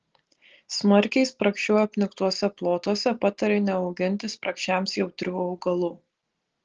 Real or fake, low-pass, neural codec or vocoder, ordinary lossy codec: real; 7.2 kHz; none; Opus, 16 kbps